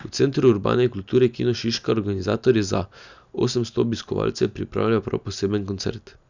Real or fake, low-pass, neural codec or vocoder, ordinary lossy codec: real; 7.2 kHz; none; Opus, 64 kbps